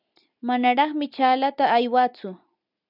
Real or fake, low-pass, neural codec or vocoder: real; 5.4 kHz; none